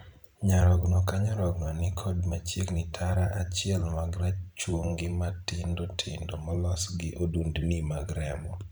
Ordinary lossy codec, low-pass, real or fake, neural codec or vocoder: none; none; fake; vocoder, 44.1 kHz, 128 mel bands every 512 samples, BigVGAN v2